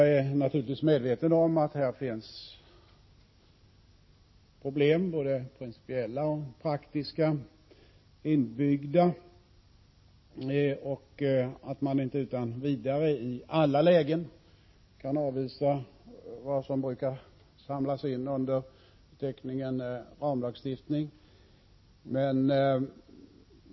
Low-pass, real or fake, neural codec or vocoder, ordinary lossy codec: 7.2 kHz; real; none; MP3, 24 kbps